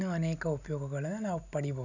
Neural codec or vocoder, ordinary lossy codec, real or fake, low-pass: none; none; real; 7.2 kHz